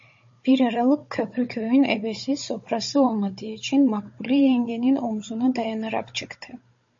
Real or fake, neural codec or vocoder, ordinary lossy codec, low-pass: fake; codec, 16 kHz, 16 kbps, FunCodec, trained on Chinese and English, 50 frames a second; MP3, 32 kbps; 7.2 kHz